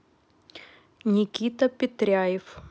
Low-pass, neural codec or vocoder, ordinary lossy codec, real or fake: none; none; none; real